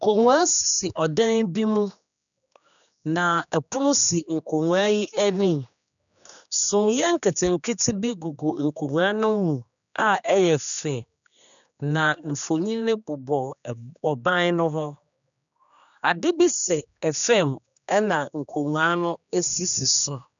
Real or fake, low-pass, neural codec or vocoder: fake; 7.2 kHz; codec, 16 kHz, 2 kbps, X-Codec, HuBERT features, trained on general audio